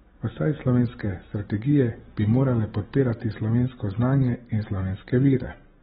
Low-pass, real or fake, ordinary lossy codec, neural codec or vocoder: 19.8 kHz; real; AAC, 16 kbps; none